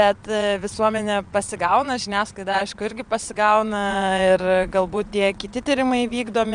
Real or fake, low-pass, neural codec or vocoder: fake; 9.9 kHz; vocoder, 22.05 kHz, 80 mel bands, Vocos